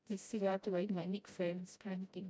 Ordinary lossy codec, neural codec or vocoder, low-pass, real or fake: none; codec, 16 kHz, 0.5 kbps, FreqCodec, smaller model; none; fake